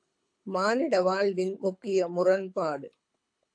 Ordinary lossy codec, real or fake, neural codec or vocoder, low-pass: AAC, 64 kbps; fake; codec, 24 kHz, 6 kbps, HILCodec; 9.9 kHz